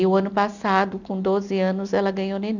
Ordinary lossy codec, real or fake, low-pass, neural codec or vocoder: none; real; 7.2 kHz; none